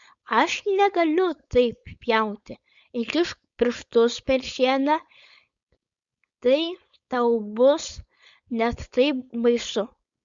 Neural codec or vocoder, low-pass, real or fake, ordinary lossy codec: codec, 16 kHz, 4.8 kbps, FACodec; 7.2 kHz; fake; Opus, 64 kbps